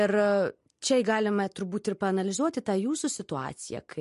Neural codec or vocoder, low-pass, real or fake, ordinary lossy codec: none; 14.4 kHz; real; MP3, 48 kbps